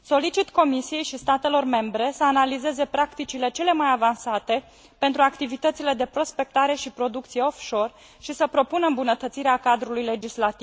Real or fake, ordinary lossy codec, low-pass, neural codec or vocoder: real; none; none; none